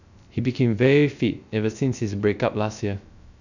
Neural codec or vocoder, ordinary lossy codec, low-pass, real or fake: codec, 16 kHz, 0.3 kbps, FocalCodec; none; 7.2 kHz; fake